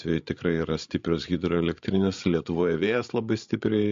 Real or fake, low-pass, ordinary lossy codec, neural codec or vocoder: fake; 7.2 kHz; MP3, 48 kbps; codec, 16 kHz, 16 kbps, FreqCodec, larger model